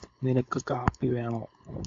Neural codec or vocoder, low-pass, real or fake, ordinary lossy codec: codec, 16 kHz, 4.8 kbps, FACodec; 7.2 kHz; fake; MP3, 48 kbps